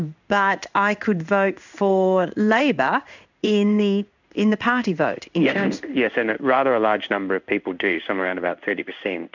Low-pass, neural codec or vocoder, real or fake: 7.2 kHz; codec, 16 kHz in and 24 kHz out, 1 kbps, XY-Tokenizer; fake